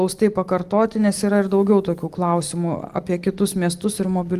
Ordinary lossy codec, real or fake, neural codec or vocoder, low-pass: Opus, 24 kbps; real; none; 14.4 kHz